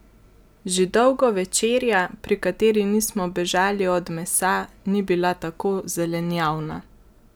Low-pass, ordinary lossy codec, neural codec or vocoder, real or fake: none; none; none; real